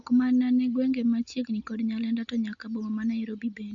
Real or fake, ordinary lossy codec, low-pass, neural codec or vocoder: real; Opus, 64 kbps; 7.2 kHz; none